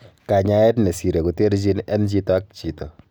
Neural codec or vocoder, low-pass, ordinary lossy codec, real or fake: none; none; none; real